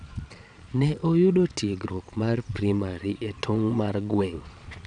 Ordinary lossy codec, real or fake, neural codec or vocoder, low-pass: none; fake; vocoder, 22.05 kHz, 80 mel bands, Vocos; 9.9 kHz